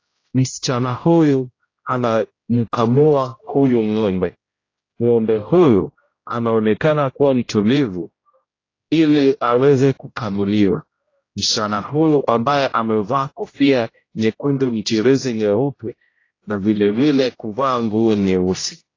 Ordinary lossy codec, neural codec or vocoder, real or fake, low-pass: AAC, 32 kbps; codec, 16 kHz, 0.5 kbps, X-Codec, HuBERT features, trained on general audio; fake; 7.2 kHz